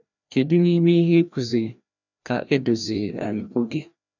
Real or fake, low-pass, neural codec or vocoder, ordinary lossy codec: fake; 7.2 kHz; codec, 16 kHz, 1 kbps, FreqCodec, larger model; none